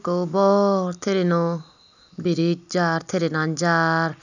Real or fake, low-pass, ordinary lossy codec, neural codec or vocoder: real; 7.2 kHz; none; none